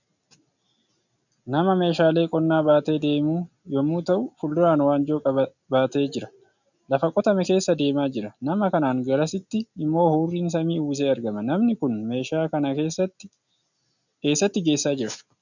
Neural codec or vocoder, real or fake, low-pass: none; real; 7.2 kHz